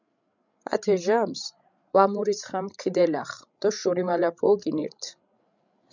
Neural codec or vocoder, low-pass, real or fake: codec, 16 kHz, 16 kbps, FreqCodec, larger model; 7.2 kHz; fake